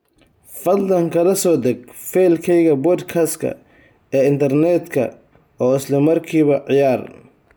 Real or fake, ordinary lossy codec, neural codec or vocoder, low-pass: real; none; none; none